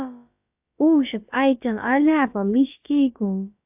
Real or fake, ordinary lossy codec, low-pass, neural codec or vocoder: fake; AAC, 32 kbps; 3.6 kHz; codec, 16 kHz, about 1 kbps, DyCAST, with the encoder's durations